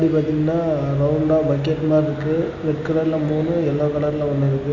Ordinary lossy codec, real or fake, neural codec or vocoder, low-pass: none; real; none; 7.2 kHz